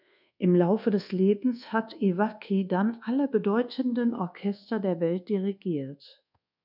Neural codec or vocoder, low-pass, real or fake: codec, 24 kHz, 1.2 kbps, DualCodec; 5.4 kHz; fake